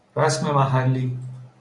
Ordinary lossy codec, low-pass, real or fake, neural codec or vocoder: MP3, 64 kbps; 10.8 kHz; real; none